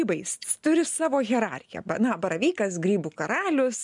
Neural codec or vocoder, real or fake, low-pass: none; real; 10.8 kHz